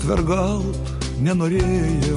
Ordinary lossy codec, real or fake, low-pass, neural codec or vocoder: MP3, 48 kbps; real; 10.8 kHz; none